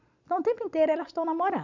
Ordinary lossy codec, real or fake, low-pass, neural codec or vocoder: none; real; 7.2 kHz; none